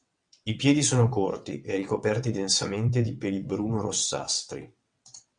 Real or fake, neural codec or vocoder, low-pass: fake; vocoder, 22.05 kHz, 80 mel bands, WaveNeXt; 9.9 kHz